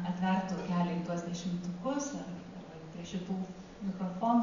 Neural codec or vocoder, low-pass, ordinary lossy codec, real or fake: none; 7.2 kHz; Opus, 64 kbps; real